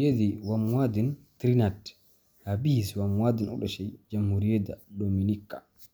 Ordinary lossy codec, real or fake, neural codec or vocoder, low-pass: none; real; none; none